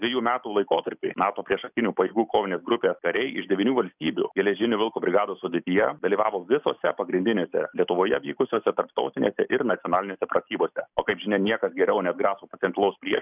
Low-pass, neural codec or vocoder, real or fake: 3.6 kHz; none; real